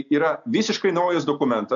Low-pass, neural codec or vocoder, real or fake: 7.2 kHz; none; real